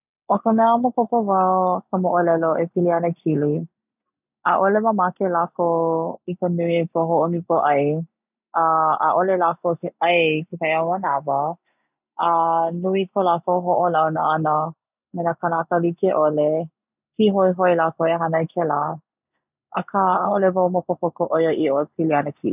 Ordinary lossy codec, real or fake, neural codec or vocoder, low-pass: none; real; none; 3.6 kHz